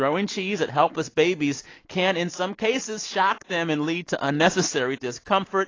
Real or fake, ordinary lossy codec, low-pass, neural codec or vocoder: real; AAC, 32 kbps; 7.2 kHz; none